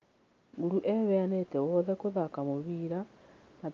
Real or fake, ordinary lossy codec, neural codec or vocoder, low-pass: real; Opus, 24 kbps; none; 7.2 kHz